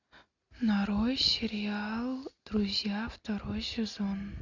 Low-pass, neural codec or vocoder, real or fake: 7.2 kHz; none; real